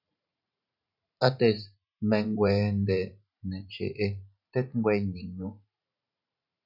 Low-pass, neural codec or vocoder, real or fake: 5.4 kHz; vocoder, 44.1 kHz, 128 mel bands every 512 samples, BigVGAN v2; fake